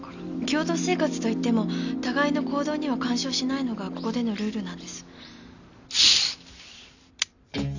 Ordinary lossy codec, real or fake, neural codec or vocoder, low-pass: none; real; none; 7.2 kHz